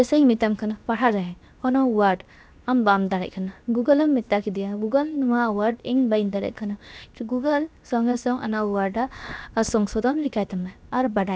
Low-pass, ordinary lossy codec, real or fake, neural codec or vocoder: none; none; fake; codec, 16 kHz, 0.7 kbps, FocalCodec